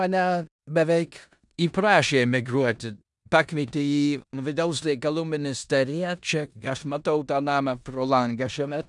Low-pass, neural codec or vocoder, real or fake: 10.8 kHz; codec, 16 kHz in and 24 kHz out, 0.9 kbps, LongCat-Audio-Codec, four codebook decoder; fake